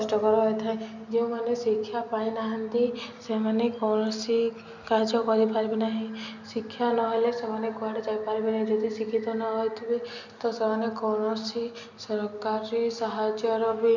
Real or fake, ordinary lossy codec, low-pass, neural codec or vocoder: real; none; 7.2 kHz; none